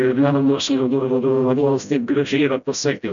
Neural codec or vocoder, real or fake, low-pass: codec, 16 kHz, 0.5 kbps, FreqCodec, smaller model; fake; 7.2 kHz